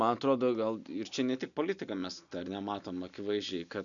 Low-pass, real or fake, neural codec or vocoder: 7.2 kHz; real; none